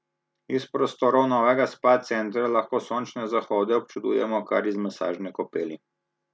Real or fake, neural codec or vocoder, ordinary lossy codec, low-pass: real; none; none; none